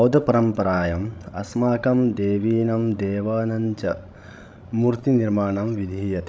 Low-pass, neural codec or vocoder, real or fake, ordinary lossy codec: none; codec, 16 kHz, 16 kbps, FreqCodec, larger model; fake; none